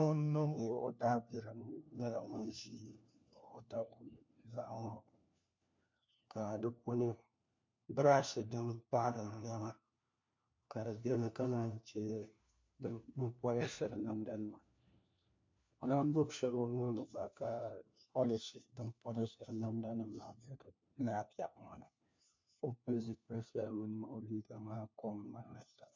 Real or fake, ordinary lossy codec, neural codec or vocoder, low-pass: fake; MP3, 48 kbps; codec, 16 kHz, 1 kbps, FunCodec, trained on LibriTTS, 50 frames a second; 7.2 kHz